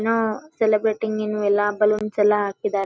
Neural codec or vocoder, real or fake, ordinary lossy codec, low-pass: none; real; none; none